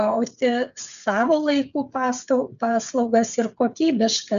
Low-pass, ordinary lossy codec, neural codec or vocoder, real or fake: 7.2 kHz; MP3, 96 kbps; codec, 16 kHz, 8 kbps, FreqCodec, smaller model; fake